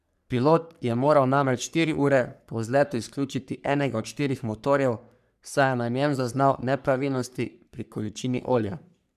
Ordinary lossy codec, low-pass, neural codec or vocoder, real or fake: none; 14.4 kHz; codec, 44.1 kHz, 3.4 kbps, Pupu-Codec; fake